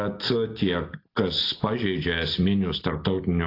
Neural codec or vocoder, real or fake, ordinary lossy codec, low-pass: none; real; AAC, 32 kbps; 5.4 kHz